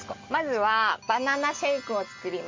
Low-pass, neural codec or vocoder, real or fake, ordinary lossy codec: 7.2 kHz; none; real; MP3, 48 kbps